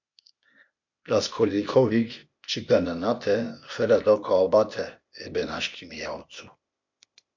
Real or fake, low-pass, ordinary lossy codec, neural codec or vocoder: fake; 7.2 kHz; MP3, 48 kbps; codec, 16 kHz, 0.8 kbps, ZipCodec